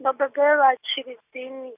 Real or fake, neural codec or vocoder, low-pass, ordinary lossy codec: real; none; 3.6 kHz; none